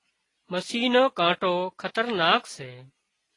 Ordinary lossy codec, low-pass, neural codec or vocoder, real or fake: AAC, 32 kbps; 10.8 kHz; none; real